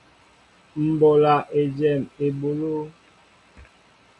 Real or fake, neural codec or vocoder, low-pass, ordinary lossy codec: real; none; 10.8 kHz; MP3, 96 kbps